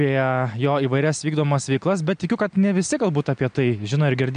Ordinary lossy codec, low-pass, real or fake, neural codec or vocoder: MP3, 64 kbps; 9.9 kHz; real; none